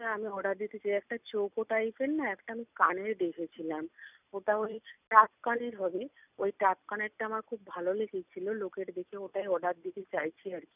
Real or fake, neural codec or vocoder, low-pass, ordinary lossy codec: real; none; 3.6 kHz; none